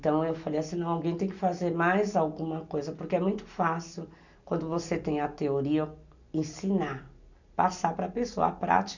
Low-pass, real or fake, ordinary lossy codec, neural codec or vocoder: 7.2 kHz; real; none; none